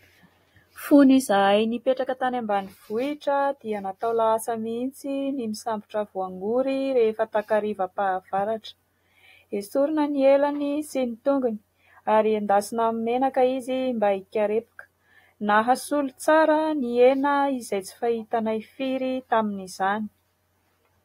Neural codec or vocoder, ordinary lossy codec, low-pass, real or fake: none; AAC, 48 kbps; 19.8 kHz; real